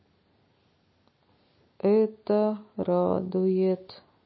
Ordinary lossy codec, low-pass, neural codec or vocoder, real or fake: MP3, 24 kbps; 7.2 kHz; none; real